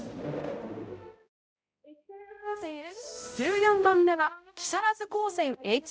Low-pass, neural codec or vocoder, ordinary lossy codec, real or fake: none; codec, 16 kHz, 0.5 kbps, X-Codec, HuBERT features, trained on balanced general audio; none; fake